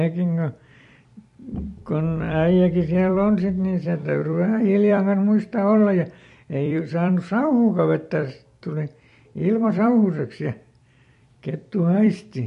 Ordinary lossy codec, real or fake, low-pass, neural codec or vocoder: MP3, 48 kbps; fake; 14.4 kHz; vocoder, 44.1 kHz, 128 mel bands every 256 samples, BigVGAN v2